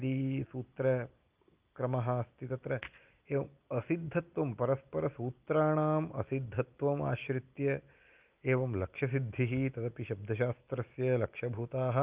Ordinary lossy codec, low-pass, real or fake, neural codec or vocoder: Opus, 24 kbps; 3.6 kHz; real; none